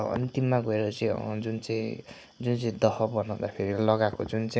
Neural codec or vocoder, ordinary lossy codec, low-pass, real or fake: none; none; none; real